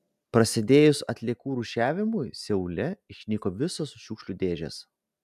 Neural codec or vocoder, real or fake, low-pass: none; real; 14.4 kHz